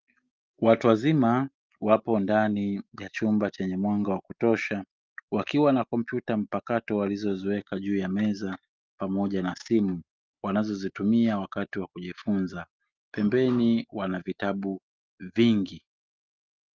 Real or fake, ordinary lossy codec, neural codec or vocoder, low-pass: real; Opus, 32 kbps; none; 7.2 kHz